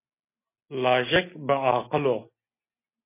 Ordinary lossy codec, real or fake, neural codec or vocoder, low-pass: MP3, 24 kbps; real; none; 3.6 kHz